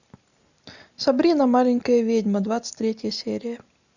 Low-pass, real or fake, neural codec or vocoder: 7.2 kHz; real; none